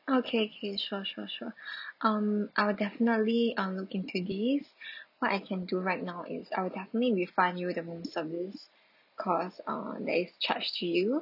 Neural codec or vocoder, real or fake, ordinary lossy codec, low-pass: none; real; none; 5.4 kHz